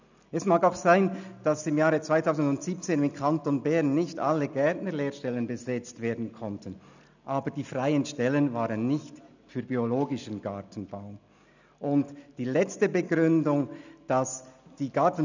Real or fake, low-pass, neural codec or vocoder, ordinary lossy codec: real; 7.2 kHz; none; none